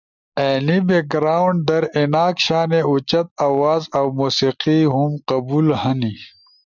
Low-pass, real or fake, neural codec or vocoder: 7.2 kHz; real; none